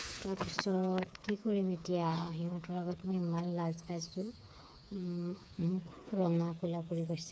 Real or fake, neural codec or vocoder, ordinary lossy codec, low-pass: fake; codec, 16 kHz, 4 kbps, FreqCodec, smaller model; none; none